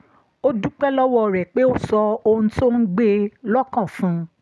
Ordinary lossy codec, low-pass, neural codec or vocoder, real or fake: none; none; none; real